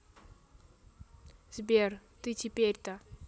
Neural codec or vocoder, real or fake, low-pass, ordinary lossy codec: none; real; none; none